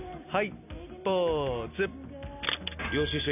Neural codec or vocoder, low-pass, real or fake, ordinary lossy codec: none; 3.6 kHz; real; none